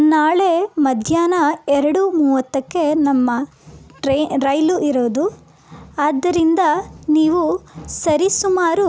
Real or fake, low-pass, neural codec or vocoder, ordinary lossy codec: real; none; none; none